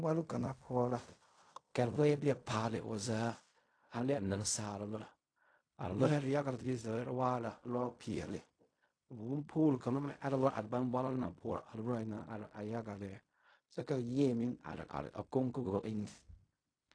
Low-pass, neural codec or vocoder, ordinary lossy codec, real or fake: 9.9 kHz; codec, 16 kHz in and 24 kHz out, 0.4 kbps, LongCat-Audio-Codec, fine tuned four codebook decoder; AAC, 48 kbps; fake